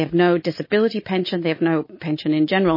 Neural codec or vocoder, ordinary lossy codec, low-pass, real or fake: none; MP3, 24 kbps; 5.4 kHz; real